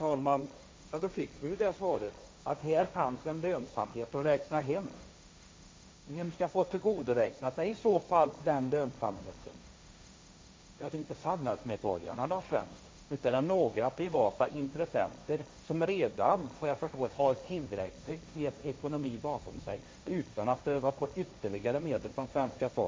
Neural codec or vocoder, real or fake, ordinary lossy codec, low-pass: codec, 16 kHz, 1.1 kbps, Voila-Tokenizer; fake; none; none